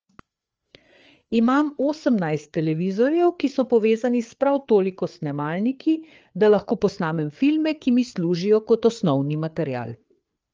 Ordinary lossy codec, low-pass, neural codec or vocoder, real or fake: Opus, 24 kbps; 7.2 kHz; codec, 16 kHz, 4 kbps, FreqCodec, larger model; fake